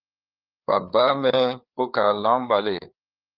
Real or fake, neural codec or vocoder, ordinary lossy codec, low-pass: fake; codec, 16 kHz, 4 kbps, FreqCodec, larger model; Opus, 24 kbps; 5.4 kHz